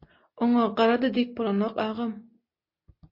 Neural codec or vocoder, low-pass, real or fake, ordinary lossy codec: none; 5.4 kHz; real; MP3, 32 kbps